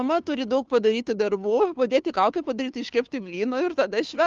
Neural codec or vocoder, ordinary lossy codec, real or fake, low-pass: codec, 16 kHz, 2 kbps, FunCodec, trained on Chinese and English, 25 frames a second; Opus, 32 kbps; fake; 7.2 kHz